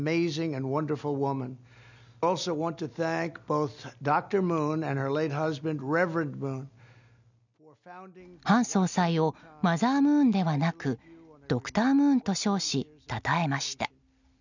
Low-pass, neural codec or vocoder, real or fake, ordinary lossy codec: 7.2 kHz; none; real; none